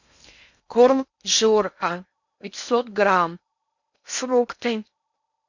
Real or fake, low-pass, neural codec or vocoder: fake; 7.2 kHz; codec, 16 kHz in and 24 kHz out, 0.6 kbps, FocalCodec, streaming, 2048 codes